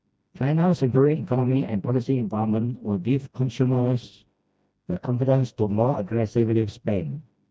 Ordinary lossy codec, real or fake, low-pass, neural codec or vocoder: none; fake; none; codec, 16 kHz, 1 kbps, FreqCodec, smaller model